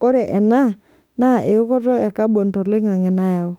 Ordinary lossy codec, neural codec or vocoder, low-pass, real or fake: none; autoencoder, 48 kHz, 32 numbers a frame, DAC-VAE, trained on Japanese speech; 19.8 kHz; fake